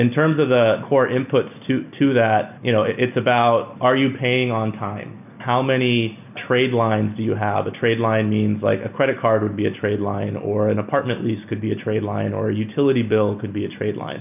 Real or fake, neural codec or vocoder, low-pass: real; none; 3.6 kHz